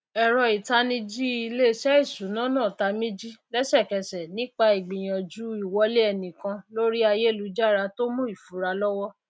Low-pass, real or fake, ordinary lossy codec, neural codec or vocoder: none; real; none; none